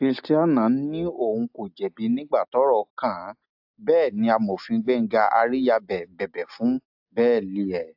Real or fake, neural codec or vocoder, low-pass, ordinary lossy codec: real; none; 5.4 kHz; AAC, 48 kbps